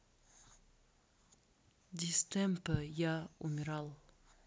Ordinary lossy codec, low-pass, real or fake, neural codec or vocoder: none; none; real; none